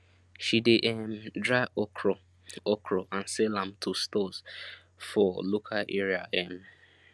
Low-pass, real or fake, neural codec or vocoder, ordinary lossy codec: none; real; none; none